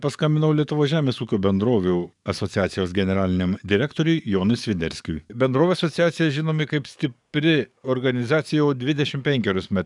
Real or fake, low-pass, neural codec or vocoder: fake; 10.8 kHz; codec, 44.1 kHz, 7.8 kbps, DAC